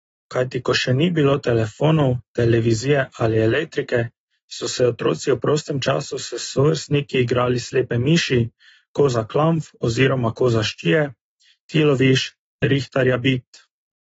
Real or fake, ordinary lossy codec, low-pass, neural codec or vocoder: real; AAC, 24 kbps; 9.9 kHz; none